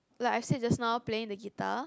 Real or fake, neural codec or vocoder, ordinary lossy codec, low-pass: real; none; none; none